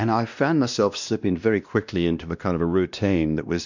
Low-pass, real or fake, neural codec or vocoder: 7.2 kHz; fake; codec, 16 kHz, 1 kbps, X-Codec, WavLM features, trained on Multilingual LibriSpeech